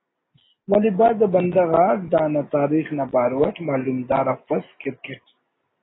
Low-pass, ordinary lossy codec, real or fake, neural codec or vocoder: 7.2 kHz; AAC, 16 kbps; real; none